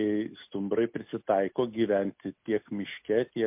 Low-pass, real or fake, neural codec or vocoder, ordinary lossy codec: 3.6 kHz; real; none; MP3, 32 kbps